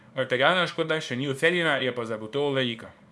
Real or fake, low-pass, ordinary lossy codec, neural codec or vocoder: fake; none; none; codec, 24 kHz, 0.9 kbps, WavTokenizer, small release